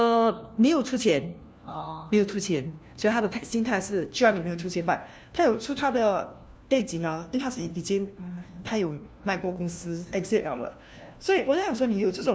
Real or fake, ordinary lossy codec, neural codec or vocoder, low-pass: fake; none; codec, 16 kHz, 1 kbps, FunCodec, trained on LibriTTS, 50 frames a second; none